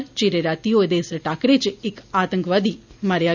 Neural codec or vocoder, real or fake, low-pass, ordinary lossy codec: none; real; 7.2 kHz; none